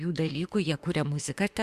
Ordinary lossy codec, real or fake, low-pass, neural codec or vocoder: Opus, 64 kbps; fake; 14.4 kHz; autoencoder, 48 kHz, 32 numbers a frame, DAC-VAE, trained on Japanese speech